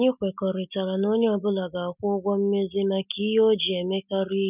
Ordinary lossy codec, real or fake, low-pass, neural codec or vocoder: none; real; 3.6 kHz; none